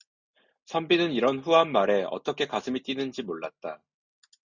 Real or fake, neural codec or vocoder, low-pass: real; none; 7.2 kHz